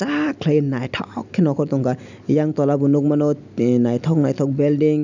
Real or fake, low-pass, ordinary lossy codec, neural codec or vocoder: real; 7.2 kHz; none; none